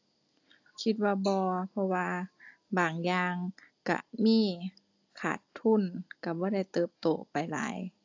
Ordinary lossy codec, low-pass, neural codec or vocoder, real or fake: AAC, 48 kbps; 7.2 kHz; none; real